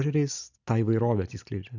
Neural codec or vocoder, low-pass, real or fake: none; 7.2 kHz; real